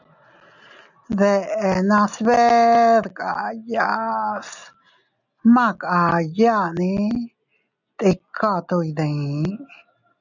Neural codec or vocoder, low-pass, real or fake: none; 7.2 kHz; real